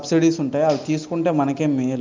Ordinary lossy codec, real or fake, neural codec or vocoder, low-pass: Opus, 24 kbps; real; none; 7.2 kHz